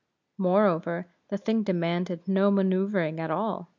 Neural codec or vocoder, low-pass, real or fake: none; 7.2 kHz; real